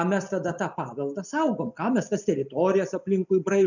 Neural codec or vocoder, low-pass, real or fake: none; 7.2 kHz; real